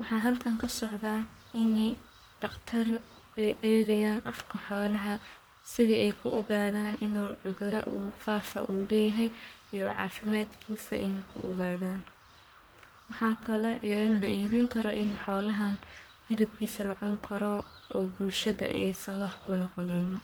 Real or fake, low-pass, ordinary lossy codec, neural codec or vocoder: fake; none; none; codec, 44.1 kHz, 1.7 kbps, Pupu-Codec